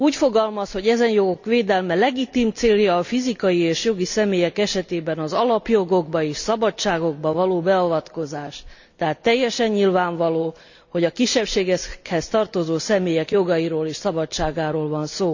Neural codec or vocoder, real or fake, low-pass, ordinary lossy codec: none; real; 7.2 kHz; none